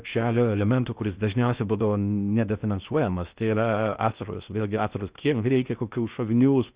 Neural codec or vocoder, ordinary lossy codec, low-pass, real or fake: codec, 16 kHz in and 24 kHz out, 0.6 kbps, FocalCodec, streaming, 2048 codes; Opus, 64 kbps; 3.6 kHz; fake